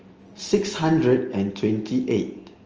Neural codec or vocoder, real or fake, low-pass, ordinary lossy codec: none; real; 7.2 kHz; Opus, 24 kbps